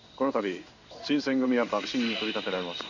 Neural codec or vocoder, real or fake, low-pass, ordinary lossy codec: codec, 16 kHz in and 24 kHz out, 1 kbps, XY-Tokenizer; fake; 7.2 kHz; none